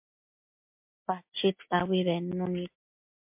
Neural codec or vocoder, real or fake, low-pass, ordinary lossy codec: none; real; 3.6 kHz; MP3, 32 kbps